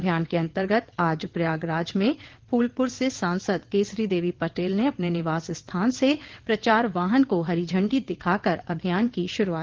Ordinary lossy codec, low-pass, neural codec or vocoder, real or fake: Opus, 16 kbps; 7.2 kHz; vocoder, 22.05 kHz, 80 mel bands, WaveNeXt; fake